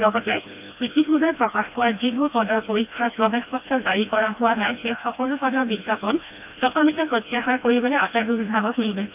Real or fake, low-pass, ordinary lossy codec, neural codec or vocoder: fake; 3.6 kHz; none; codec, 16 kHz, 1 kbps, FreqCodec, smaller model